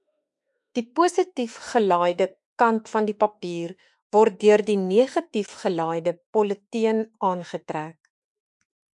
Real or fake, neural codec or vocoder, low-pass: fake; autoencoder, 48 kHz, 32 numbers a frame, DAC-VAE, trained on Japanese speech; 10.8 kHz